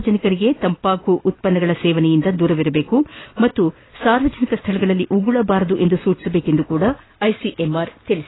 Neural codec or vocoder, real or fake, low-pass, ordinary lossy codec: none; real; 7.2 kHz; AAC, 16 kbps